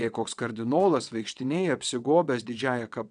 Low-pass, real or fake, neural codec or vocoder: 9.9 kHz; fake; vocoder, 22.05 kHz, 80 mel bands, WaveNeXt